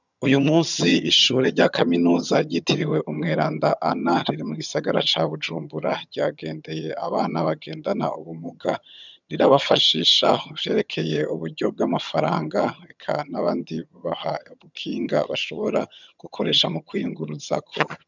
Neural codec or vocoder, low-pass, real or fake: vocoder, 22.05 kHz, 80 mel bands, HiFi-GAN; 7.2 kHz; fake